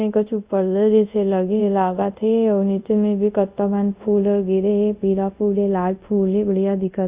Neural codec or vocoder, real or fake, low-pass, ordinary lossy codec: codec, 24 kHz, 0.5 kbps, DualCodec; fake; 3.6 kHz; Opus, 64 kbps